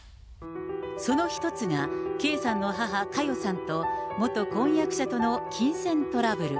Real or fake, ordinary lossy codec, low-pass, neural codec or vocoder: real; none; none; none